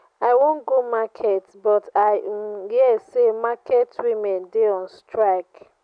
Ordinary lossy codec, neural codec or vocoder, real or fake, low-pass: none; none; real; 9.9 kHz